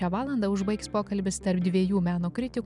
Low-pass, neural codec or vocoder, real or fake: 10.8 kHz; none; real